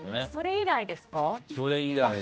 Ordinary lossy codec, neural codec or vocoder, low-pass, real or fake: none; codec, 16 kHz, 1 kbps, X-Codec, HuBERT features, trained on general audio; none; fake